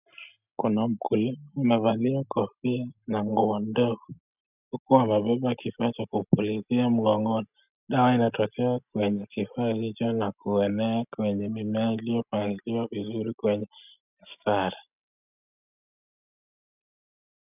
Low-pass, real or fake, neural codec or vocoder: 3.6 kHz; real; none